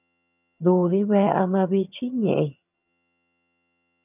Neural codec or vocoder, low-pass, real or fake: vocoder, 22.05 kHz, 80 mel bands, HiFi-GAN; 3.6 kHz; fake